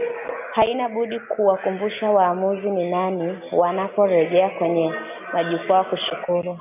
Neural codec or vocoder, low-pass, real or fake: none; 3.6 kHz; real